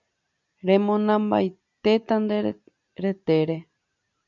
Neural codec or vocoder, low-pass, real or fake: none; 7.2 kHz; real